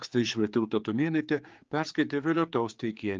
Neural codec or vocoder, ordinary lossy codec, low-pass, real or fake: codec, 16 kHz, 4 kbps, X-Codec, HuBERT features, trained on general audio; Opus, 24 kbps; 7.2 kHz; fake